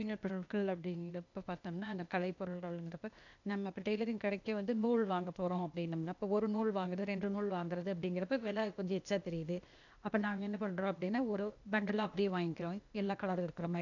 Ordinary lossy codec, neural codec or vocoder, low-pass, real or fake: none; codec, 16 kHz, 0.8 kbps, ZipCodec; 7.2 kHz; fake